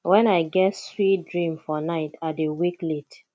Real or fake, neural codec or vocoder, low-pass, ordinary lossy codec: real; none; none; none